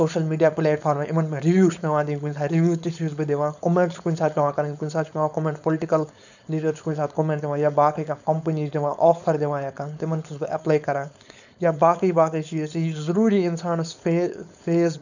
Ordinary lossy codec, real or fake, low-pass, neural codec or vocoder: none; fake; 7.2 kHz; codec, 16 kHz, 4.8 kbps, FACodec